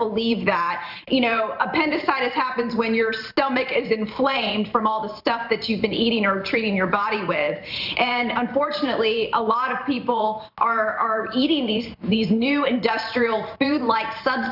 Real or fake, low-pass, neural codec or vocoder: fake; 5.4 kHz; vocoder, 44.1 kHz, 128 mel bands every 512 samples, BigVGAN v2